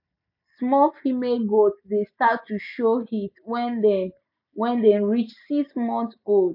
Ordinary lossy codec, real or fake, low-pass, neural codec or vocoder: none; real; 5.4 kHz; none